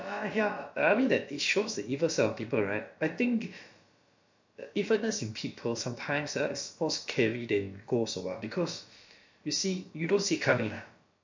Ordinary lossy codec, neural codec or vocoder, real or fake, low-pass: MP3, 48 kbps; codec, 16 kHz, about 1 kbps, DyCAST, with the encoder's durations; fake; 7.2 kHz